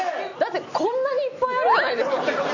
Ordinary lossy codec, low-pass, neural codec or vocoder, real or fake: none; 7.2 kHz; none; real